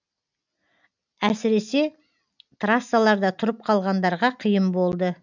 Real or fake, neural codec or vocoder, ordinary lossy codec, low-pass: real; none; none; 7.2 kHz